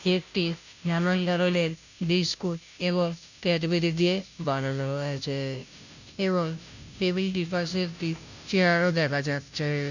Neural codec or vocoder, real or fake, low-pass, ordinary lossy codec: codec, 16 kHz, 0.5 kbps, FunCodec, trained on Chinese and English, 25 frames a second; fake; 7.2 kHz; none